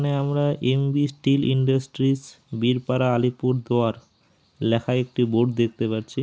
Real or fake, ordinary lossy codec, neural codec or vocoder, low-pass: real; none; none; none